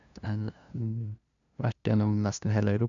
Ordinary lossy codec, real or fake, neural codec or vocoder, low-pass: none; fake; codec, 16 kHz, 0.5 kbps, FunCodec, trained on LibriTTS, 25 frames a second; 7.2 kHz